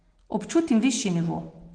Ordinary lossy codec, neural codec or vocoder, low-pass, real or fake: Opus, 16 kbps; none; 9.9 kHz; real